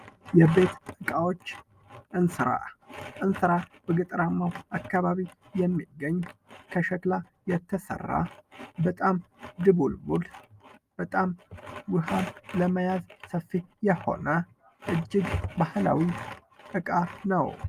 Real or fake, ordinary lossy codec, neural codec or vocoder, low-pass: real; Opus, 32 kbps; none; 14.4 kHz